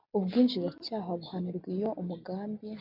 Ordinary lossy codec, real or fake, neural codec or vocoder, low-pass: MP3, 48 kbps; real; none; 5.4 kHz